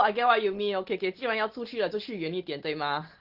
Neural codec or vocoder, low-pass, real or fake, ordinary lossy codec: none; 5.4 kHz; real; Opus, 16 kbps